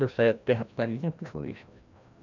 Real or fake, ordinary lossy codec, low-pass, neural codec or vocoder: fake; none; 7.2 kHz; codec, 16 kHz, 1 kbps, FreqCodec, larger model